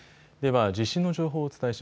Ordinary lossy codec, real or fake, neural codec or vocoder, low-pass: none; real; none; none